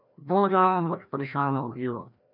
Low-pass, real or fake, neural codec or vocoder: 5.4 kHz; fake; codec, 16 kHz, 1 kbps, FreqCodec, larger model